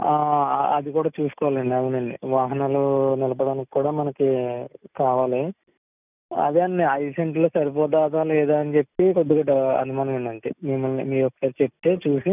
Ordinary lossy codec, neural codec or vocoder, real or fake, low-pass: none; none; real; 3.6 kHz